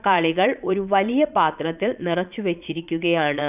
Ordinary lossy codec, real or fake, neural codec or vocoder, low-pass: none; fake; vocoder, 22.05 kHz, 80 mel bands, Vocos; 3.6 kHz